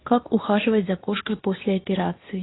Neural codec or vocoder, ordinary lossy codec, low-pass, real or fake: codec, 24 kHz, 0.9 kbps, WavTokenizer, medium speech release version 2; AAC, 16 kbps; 7.2 kHz; fake